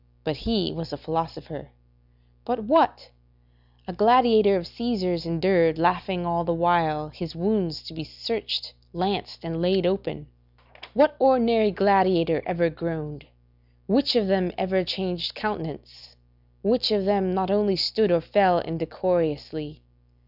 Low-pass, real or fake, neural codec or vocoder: 5.4 kHz; real; none